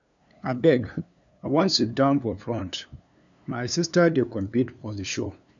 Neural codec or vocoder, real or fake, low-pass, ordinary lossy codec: codec, 16 kHz, 2 kbps, FunCodec, trained on LibriTTS, 25 frames a second; fake; 7.2 kHz; none